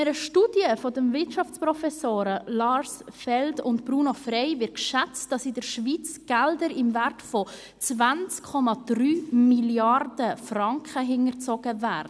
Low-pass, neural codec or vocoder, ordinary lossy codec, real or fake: none; none; none; real